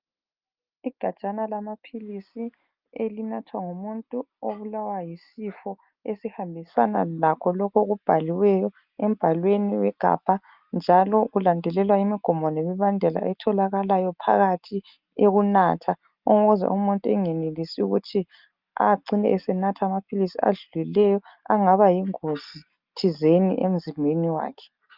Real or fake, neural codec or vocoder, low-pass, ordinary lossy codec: real; none; 5.4 kHz; Opus, 24 kbps